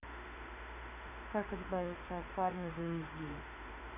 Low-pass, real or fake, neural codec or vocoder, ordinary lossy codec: 3.6 kHz; fake; autoencoder, 48 kHz, 32 numbers a frame, DAC-VAE, trained on Japanese speech; none